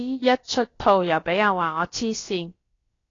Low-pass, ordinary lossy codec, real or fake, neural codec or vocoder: 7.2 kHz; AAC, 32 kbps; fake; codec, 16 kHz, about 1 kbps, DyCAST, with the encoder's durations